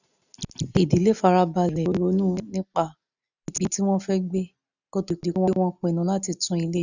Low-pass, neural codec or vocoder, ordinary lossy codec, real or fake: 7.2 kHz; none; none; real